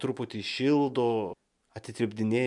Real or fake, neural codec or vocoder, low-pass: real; none; 10.8 kHz